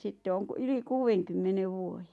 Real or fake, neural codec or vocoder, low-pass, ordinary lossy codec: real; none; none; none